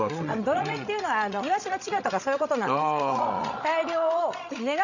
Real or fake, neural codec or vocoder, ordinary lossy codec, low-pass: fake; codec, 16 kHz, 16 kbps, FreqCodec, larger model; none; 7.2 kHz